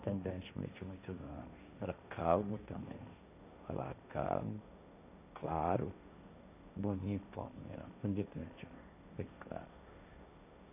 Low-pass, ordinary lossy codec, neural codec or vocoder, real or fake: 3.6 kHz; none; codec, 16 kHz, 1.1 kbps, Voila-Tokenizer; fake